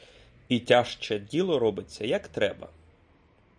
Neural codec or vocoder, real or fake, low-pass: none; real; 9.9 kHz